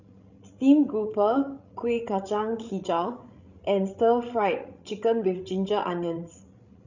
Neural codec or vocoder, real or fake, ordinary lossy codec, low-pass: codec, 16 kHz, 16 kbps, FreqCodec, larger model; fake; none; 7.2 kHz